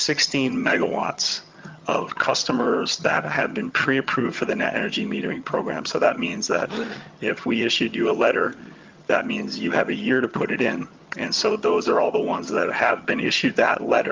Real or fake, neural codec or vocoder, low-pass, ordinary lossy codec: fake; vocoder, 22.05 kHz, 80 mel bands, HiFi-GAN; 7.2 kHz; Opus, 32 kbps